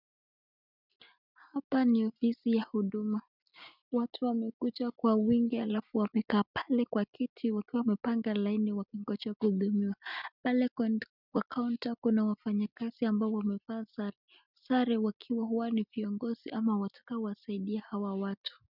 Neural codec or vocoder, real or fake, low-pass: none; real; 5.4 kHz